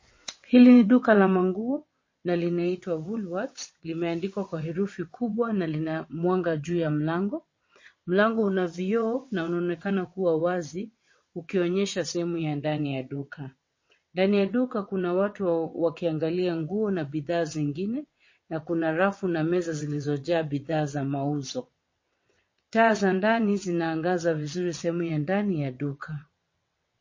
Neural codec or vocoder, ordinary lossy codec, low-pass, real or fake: vocoder, 22.05 kHz, 80 mel bands, WaveNeXt; MP3, 32 kbps; 7.2 kHz; fake